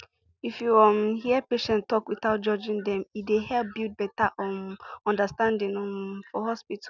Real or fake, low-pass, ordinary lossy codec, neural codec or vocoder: real; 7.2 kHz; none; none